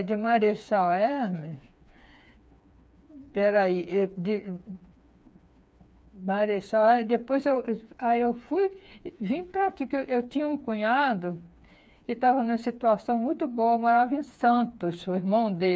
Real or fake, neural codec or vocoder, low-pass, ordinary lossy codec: fake; codec, 16 kHz, 4 kbps, FreqCodec, smaller model; none; none